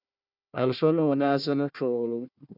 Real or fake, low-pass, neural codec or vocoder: fake; 5.4 kHz; codec, 16 kHz, 1 kbps, FunCodec, trained on Chinese and English, 50 frames a second